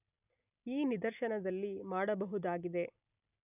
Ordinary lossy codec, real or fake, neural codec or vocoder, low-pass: none; real; none; 3.6 kHz